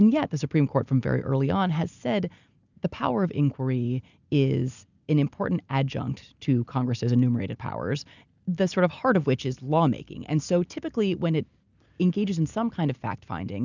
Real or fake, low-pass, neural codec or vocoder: real; 7.2 kHz; none